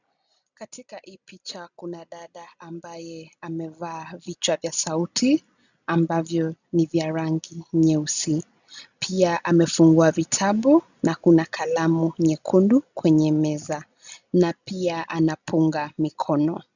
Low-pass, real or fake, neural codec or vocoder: 7.2 kHz; real; none